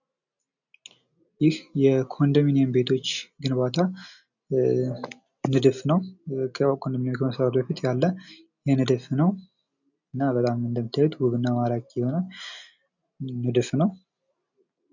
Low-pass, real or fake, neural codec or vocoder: 7.2 kHz; real; none